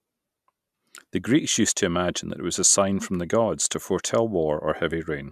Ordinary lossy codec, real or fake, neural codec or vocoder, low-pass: none; real; none; 14.4 kHz